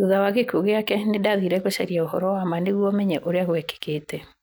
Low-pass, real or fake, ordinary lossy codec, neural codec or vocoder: none; real; none; none